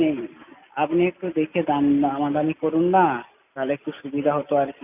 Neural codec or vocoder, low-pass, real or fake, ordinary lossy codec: none; 3.6 kHz; real; none